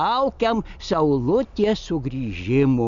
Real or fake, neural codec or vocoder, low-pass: real; none; 7.2 kHz